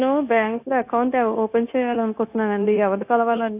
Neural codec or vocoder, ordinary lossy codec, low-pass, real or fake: codec, 16 kHz, 0.9 kbps, LongCat-Audio-Codec; AAC, 32 kbps; 3.6 kHz; fake